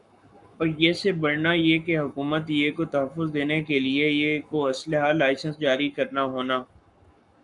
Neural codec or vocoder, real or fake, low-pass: codec, 44.1 kHz, 7.8 kbps, Pupu-Codec; fake; 10.8 kHz